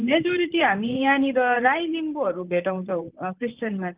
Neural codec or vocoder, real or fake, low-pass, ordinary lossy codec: vocoder, 44.1 kHz, 128 mel bands, Pupu-Vocoder; fake; 3.6 kHz; Opus, 24 kbps